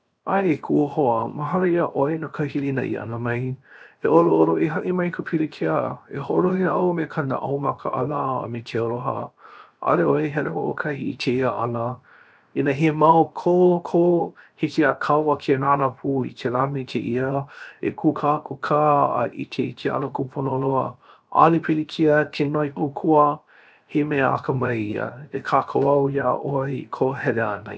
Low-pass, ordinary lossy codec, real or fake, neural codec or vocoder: none; none; fake; codec, 16 kHz, 0.7 kbps, FocalCodec